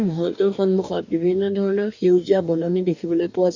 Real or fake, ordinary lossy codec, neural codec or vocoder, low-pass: fake; none; codec, 44.1 kHz, 2.6 kbps, DAC; 7.2 kHz